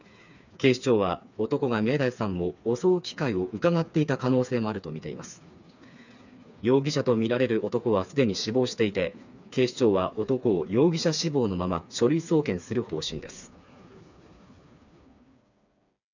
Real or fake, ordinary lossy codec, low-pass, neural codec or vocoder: fake; none; 7.2 kHz; codec, 16 kHz, 4 kbps, FreqCodec, smaller model